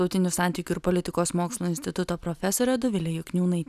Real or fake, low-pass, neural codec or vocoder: real; 14.4 kHz; none